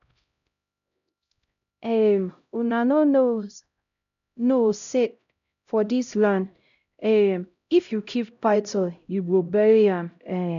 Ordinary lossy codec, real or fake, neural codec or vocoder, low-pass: none; fake; codec, 16 kHz, 0.5 kbps, X-Codec, HuBERT features, trained on LibriSpeech; 7.2 kHz